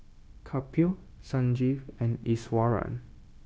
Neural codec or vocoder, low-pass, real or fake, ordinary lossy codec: codec, 16 kHz, 0.9 kbps, LongCat-Audio-Codec; none; fake; none